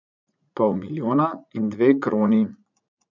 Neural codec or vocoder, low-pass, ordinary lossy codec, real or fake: vocoder, 44.1 kHz, 80 mel bands, Vocos; 7.2 kHz; none; fake